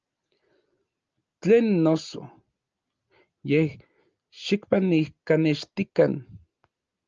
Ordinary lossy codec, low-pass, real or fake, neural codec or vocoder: Opus, 24 kbps; 7.2 kHz; real; none